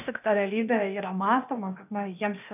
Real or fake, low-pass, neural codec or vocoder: fake; 3.6 kHz; codec, 16 kHz, 0.8 kbps, ZipCodec